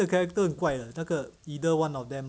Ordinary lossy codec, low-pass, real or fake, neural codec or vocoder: none; none; real; none